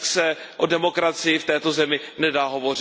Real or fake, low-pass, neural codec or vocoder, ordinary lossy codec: real; none; none; none